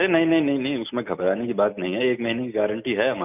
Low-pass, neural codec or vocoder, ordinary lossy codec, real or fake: 3.6 kHz; none; none; real